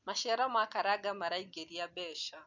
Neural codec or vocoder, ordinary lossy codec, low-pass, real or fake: none; none; 7.2 kHz; real